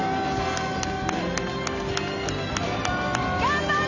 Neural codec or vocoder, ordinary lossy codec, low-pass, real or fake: none; none; 7.2 kHz; real